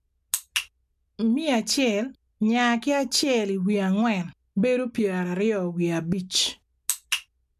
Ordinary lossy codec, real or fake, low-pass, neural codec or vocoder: MP3, 96 kbps; real; 14.4 kHz; none